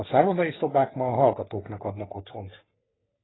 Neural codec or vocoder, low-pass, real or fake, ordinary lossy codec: codec, 44.1 kHz, 3.4 kbps, Pupu-Codec; 7.2 kHz; fake; AAC, 16 kbps